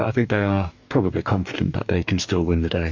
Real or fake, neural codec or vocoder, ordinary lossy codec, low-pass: fake; codec, 44.1 kHz, 2.6 kbps, DAC; MP3, 64 kbps; 7.2 kHz